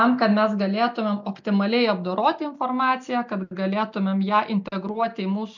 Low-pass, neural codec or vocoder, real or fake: 7.2 kHz; none; real